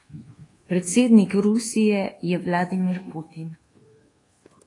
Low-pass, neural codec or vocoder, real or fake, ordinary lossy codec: 10.8 kHz; codec, 24 kHz, 1.2 kbps, DualCodec; fake; AAC, 32 kbps